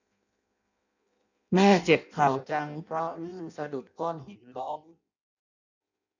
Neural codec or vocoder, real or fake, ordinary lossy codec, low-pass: codec, 16 kHz in and 24 kHz out, 0.6 kbps, FireRedTTS-2 codec; fake; none; 7.2 kHz